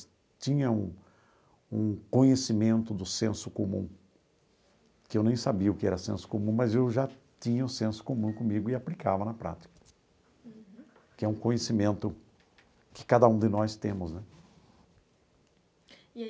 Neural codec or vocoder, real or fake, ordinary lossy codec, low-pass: none; real; none; none